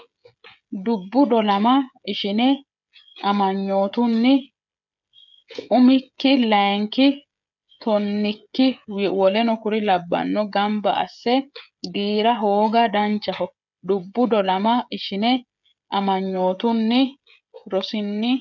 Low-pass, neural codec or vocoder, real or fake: 7.2 kHz; codec, 16 kHz, 16 kbps, FreqCodec, smaller model; fake